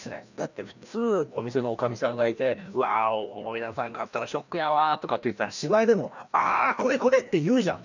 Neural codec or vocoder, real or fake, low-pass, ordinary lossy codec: codec, 16 kHz, 1 kbps, FreqCodec, larger model; fake; 7.2 kHz; none